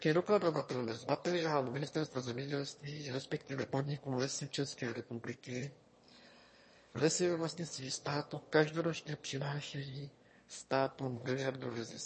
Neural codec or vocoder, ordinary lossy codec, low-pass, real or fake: autoencoder, 22.05 kHz, a latent of 192 numbers a frame, VITS, trained on one speaker; MP3, 32 kbps; 9.9 kHz; fake